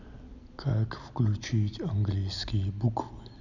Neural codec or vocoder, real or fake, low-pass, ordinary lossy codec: none; real; 7.2 kHz; none